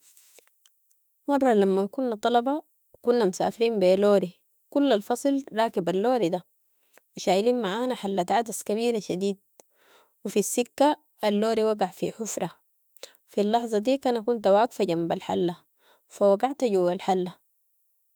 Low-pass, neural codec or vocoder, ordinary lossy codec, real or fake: none; autoencoder, 48 kHz, 32 numbers a frame, DAC-VAE, trained on Japanese speech; none; fake